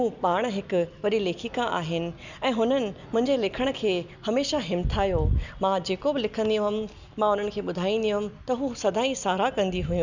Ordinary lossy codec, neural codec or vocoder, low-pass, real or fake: none; none; 7.2 kHz; real